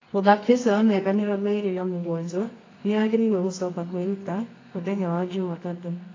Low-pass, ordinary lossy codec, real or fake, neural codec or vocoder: 7.2 kHz; AAC, 32 kbps; fake; codec, 24 kHz, 0.9 kbps, WavTokenizer, medium music audio release